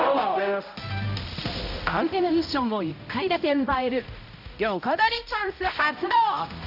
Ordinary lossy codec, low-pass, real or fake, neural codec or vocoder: none; 5.4 kHz; fake; codec, 16 kHz, 0.5 kbps, X-Codec, HuBERT features, trained on balanced general audio